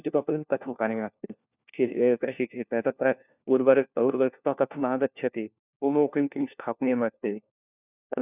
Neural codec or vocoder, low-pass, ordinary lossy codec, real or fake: codec, 16 kHz, 1 kbps, FunCodec, trained on LibriTTS, 50 frames a second; 3.6 kHz; none; fake